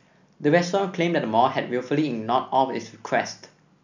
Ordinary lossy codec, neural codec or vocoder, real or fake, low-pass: none; none; real; 7.2 kHz